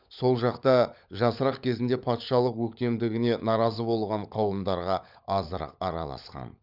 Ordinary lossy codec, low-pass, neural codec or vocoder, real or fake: none; 5.4 kHz; codec, 16 kHz, 16 kbps, FunCodec, trained on LibriTTS, 50 frames a second; fake